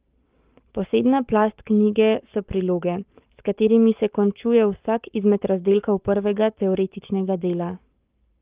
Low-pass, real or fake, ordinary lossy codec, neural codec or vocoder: 3.6 kHz; fake; Opus, 32 kbps; codec, 44.1 kHz, 7.8 kbps, Pupu-Codec